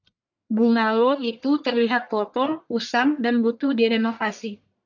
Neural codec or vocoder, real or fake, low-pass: codec, 44.1 kHz, 1.7 kbps, Pupu-Codec; fake; 7.2 kHz